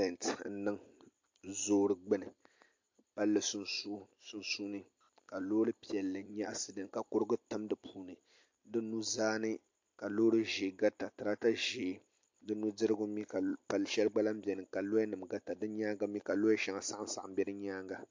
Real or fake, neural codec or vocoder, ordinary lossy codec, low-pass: real; none; MP3, 48 kbps; 7.2 kHz